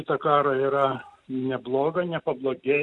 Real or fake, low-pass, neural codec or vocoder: real; 10.8 kHz; none